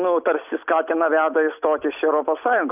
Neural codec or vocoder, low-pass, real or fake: none; 3.6 kHz; real